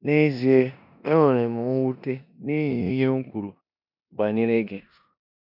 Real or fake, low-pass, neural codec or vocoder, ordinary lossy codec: fake; 5.4 kHz; codec, 16 kHz in and 24 kHz out, 0.9 kbps, LongCat-Audio-Codec, four codebook decoder; none